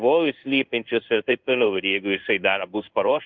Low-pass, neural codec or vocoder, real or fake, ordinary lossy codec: 7.2 kHz; codec, 16 kHz in and 24 kHz out, 1 kbps, XY-Tokenizer; fake; Opus, 24 kbps